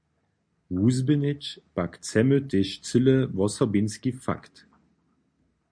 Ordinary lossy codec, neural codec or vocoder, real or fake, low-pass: MP3, 64 kbps; none; real; 9.9 kHz